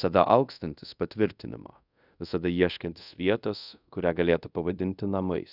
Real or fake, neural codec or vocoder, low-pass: fake; codec, 24 kHz, 0.5 kbps, DualCodec; 5.4 kHz